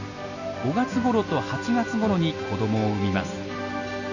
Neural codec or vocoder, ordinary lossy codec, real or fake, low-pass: none; none; real; 7.2 kHz